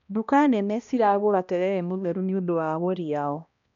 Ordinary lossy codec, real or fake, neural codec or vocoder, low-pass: none; fake; codec, 16 kHz, 1 kbps, X-Codec, HuBERT features, trained on balanced general audio; 7.2 kHz